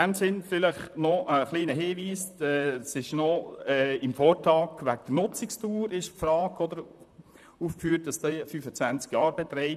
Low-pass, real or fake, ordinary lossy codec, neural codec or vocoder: 14.4 kHz; fake; none; vocoder, 44.1 kHz, 128 mel bands, Pupu-Vocoder